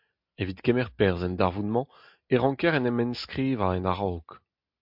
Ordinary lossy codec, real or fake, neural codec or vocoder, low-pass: MP3, 48 kbps; real; none; 5.4 kHz